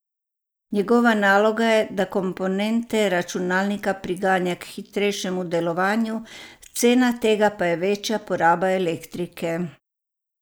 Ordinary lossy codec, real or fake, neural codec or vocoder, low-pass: none; real; none; none